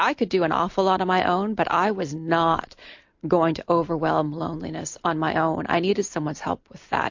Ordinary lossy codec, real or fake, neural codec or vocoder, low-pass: MP3, 48 kbps; real; none; 7.2 kHz